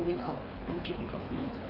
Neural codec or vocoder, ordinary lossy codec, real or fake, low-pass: codec, 24 kHz, 3 kbps, HILCodec; Opus, 64 kbps; fake; 5.4 kHz